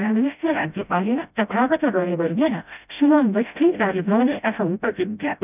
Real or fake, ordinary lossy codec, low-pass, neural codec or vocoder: fake; none; 3.6 kHz; codec, 16 kHz, 0.5 kbps, FreqCodec, smaller model